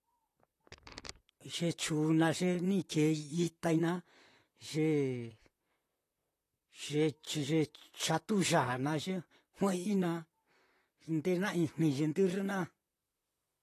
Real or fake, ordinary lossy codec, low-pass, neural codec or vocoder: fake; AAC, 48 kbps; 14.4 kHz; vocoder, 44.1 kHz, 128 mel bands, Pupu-Vocoder